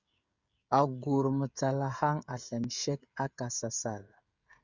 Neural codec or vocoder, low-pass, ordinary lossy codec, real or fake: codec, 16 kHz, 16 kbps, FreqCodec, smaller model; 7.2 kHz; Opus, 64 kbps; fake